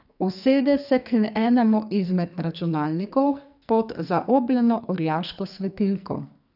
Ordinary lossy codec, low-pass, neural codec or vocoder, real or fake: none; 5.4 kHz; codec, 44.1 kHz, 2.6 kbps, SNAC; fake